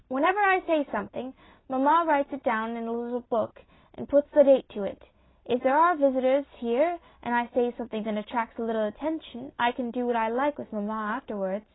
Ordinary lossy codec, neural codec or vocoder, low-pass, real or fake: AAC, 16 kbps; none; 7.2 kHz; real